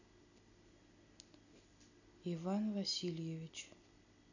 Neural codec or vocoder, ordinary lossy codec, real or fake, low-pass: none; none; real; 7.2 kHz